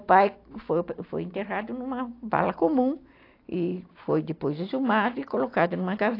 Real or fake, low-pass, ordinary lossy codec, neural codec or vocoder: real; 5.4 kHz; AAC, 32 kbps; none